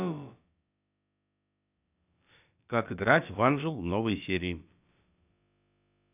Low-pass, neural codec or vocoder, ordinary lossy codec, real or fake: 3.6 kHz; codec, 16 kHz, about 1 kbps, DyCAST, with the encoder's durations; none; fake